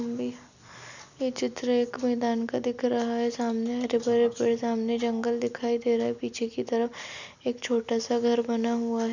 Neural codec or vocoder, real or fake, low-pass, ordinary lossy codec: none; real; 7.2 kHz; none